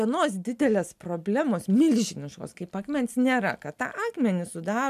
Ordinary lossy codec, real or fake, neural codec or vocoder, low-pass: MP3, 96 kbps; real; none; 14.4 kHz